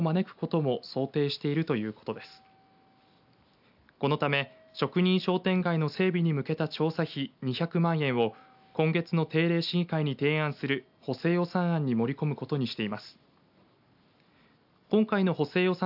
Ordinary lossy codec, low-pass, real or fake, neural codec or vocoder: AAC, 48 kbps; 5.4 kHz; real; none